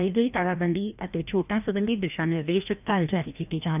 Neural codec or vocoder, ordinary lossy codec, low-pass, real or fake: codec, 16 kHz, 1 kbps, FreqCodec, larger model; none; 3.6 kHz; fake